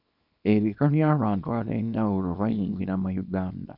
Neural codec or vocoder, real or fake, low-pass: codec, 24 kHz, 0.9 kbps, WavTokenizer, small release; fake; 5.4 kHz